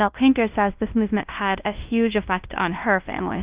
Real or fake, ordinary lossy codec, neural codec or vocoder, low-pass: fake; Opus, 32 kbps; codec, 16 kHz, 0.5 kbps, FunCodec, trained on LibriTTS, 25 frames a second; 3.6 kHz